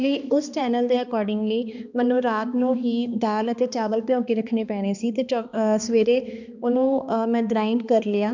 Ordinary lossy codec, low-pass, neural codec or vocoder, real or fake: none; 7.2 kHz; codec, 16 kHz, 2 kbps, X-Codec, HuBERT features, trained on balanced general audio; fake